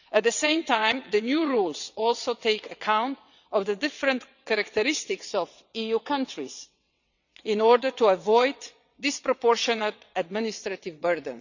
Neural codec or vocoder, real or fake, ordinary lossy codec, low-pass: vocoder, 22.05 kHz, 80 mel bands, WaveNeXt; fake; none; 7.2 kHz